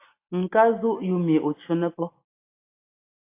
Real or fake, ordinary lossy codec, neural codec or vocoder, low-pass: real; AAC, 24 kbps; none; 3.6 kHz